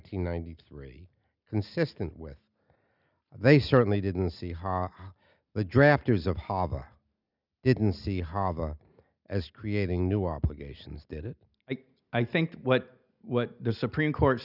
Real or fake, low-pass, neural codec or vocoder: real; 5.4 kHz; none